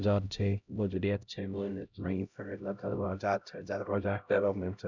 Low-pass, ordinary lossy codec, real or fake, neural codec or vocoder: 7.2 kHz; none; fake; codec, 16 kHz, 0.5 kbps, X-Codec, HuBERT features, trained on LibriSpeech